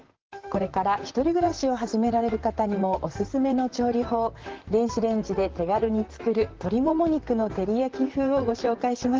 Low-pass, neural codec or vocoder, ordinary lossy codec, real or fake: 7.2 kHz; vocoder, 44.1 kHz, 128 mel bands, Pupu-Vocoder; Opus, 16 kbps; fake